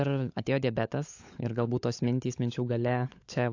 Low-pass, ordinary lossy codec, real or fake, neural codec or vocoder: 7.2 kHz; AAC, 48 kbps; fake; codec, 16 kHz, 16 kbps, FunCodec, trained on LibriTTS, 50 frames a second